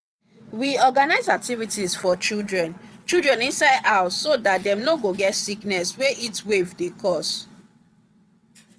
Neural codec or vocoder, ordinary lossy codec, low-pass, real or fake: vocoder, 22.05 kHz, 80 mel bands, WaveNeXt; none; none; fake